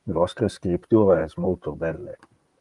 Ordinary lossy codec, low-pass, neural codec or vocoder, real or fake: Opus, 32 kbps; 10.8 kHz; vocoder, 44.1 kHz, 128 mel bands, Pupu-Vocoder; fake